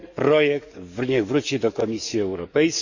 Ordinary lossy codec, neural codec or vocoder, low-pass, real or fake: none; codec, 44.1 kHz, 7.8 kbps, DAC; 7.2 kHz; fake